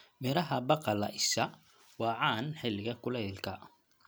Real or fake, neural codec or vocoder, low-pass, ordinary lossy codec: real; none; none; none